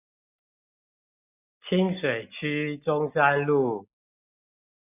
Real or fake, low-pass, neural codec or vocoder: real; 3.6 kHz; none